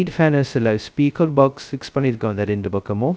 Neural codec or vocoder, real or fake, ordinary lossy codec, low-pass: codec, 16 kHz, 0.2 kbps, FocalCodec; fake; none; none